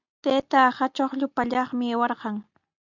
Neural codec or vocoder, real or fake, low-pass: none; real; 7.2 kHz